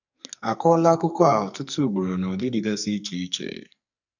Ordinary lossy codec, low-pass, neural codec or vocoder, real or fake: none; 7.2 kHz; codec, 44.1 kHz, 2.6 kbps, SNAC; fake